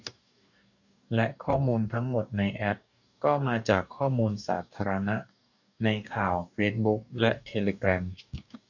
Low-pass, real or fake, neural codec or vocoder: 7.2 kHz; fake; codec, 44.1 kHz, 2.6 kbps, DAC